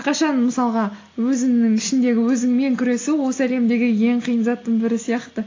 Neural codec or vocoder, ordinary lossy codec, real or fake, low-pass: none; AAC, 32 kbps; real; 7.2 kHz